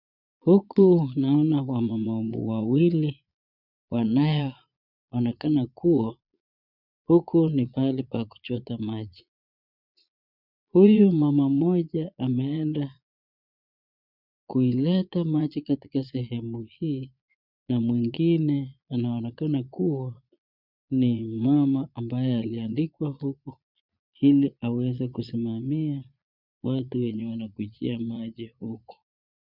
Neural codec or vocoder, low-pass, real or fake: vocoder, 22.05 kHz, 80 mel bands, Vocos; 5.4 kHz; fake